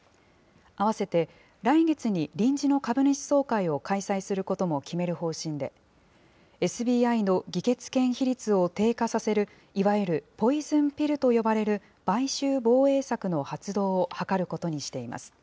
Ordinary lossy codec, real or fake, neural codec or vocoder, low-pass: none; real; none; none